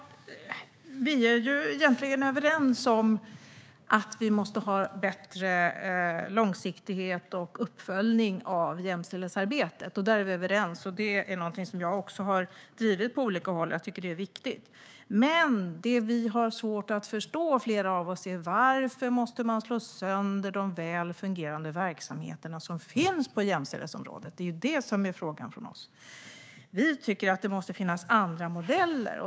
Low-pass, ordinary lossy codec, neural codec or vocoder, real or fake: none; none; codec, 16 kHz, 6 kbps, DAC; fake